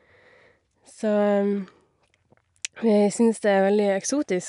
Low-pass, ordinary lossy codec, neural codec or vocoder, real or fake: 10.8 kHz; none; none; real